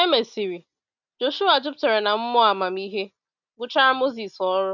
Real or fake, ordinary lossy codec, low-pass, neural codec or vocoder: real; none; 7.2 kHz; none